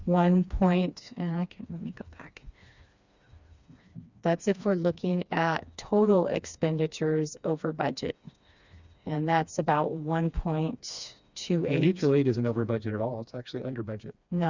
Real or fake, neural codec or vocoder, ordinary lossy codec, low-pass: fake; codec, 16 kHz, 2 kbps, FreqCodec, smaller model; Opus, 64 kbps; 7.2 kHz